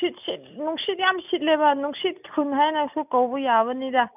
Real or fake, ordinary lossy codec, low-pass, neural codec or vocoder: real; none; 3.6 kHz; none